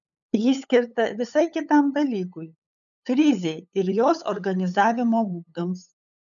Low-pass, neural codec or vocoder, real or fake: 7.2 kHz; codec, 16 kHz, 8 kbps, FunCodec, trained on LibriTTS, 25 frames a second; fake